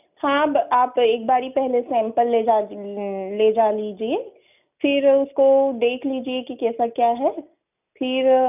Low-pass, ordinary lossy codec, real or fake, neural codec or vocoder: 3.6 kHz; none; real; none